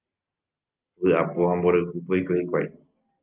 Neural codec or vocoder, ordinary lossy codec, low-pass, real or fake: none; Opus, 32 kbps; 3.6 kHz; real